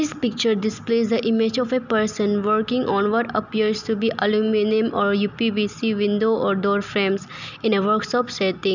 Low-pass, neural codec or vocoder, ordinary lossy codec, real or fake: 7.2 kHz; none; none; real